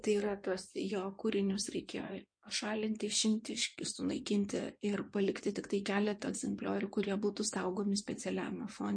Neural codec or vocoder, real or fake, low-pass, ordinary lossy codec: codec, 16 kHz in and 24 kHz out, 2.2 kbps, FireRedTTS-2 codec; fake; 9.9 kHz; MP3, 48 kbps